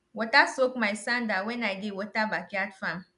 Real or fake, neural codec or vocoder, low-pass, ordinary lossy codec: real; none; 10.8 kHz; none